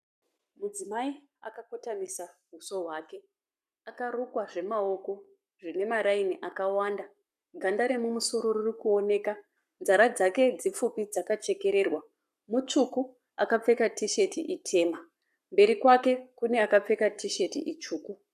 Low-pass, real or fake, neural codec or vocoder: 14.4 kHz; fake; codec, 44.1 kHz, 7.8 kbps, Pupu-Codec